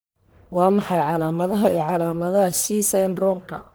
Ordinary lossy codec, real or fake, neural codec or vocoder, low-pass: none; fake; codec, 44.1 kHz, 1.7 kbps, Pupu-Codec; none